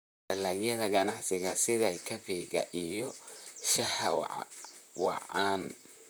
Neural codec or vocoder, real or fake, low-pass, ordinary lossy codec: vocoder, 44.1 kHz, 128 mel bands, Pupu-Vocoder; fake; none; none